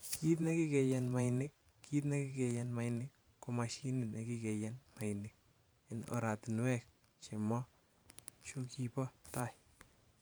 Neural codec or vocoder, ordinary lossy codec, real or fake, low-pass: vocoder, 44.1 kHz, 128 mel bands every 512 samples, BigVGAN v2; none; fake; none